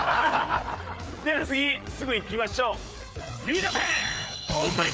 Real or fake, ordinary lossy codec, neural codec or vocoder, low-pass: fake; none; codec, 16 kHz, 4 kbps, FreqCodec, larger model; none